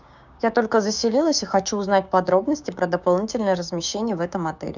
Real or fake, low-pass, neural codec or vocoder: fake; 7.2 kHz; codec, 16 kHz, 6 kbps, DAC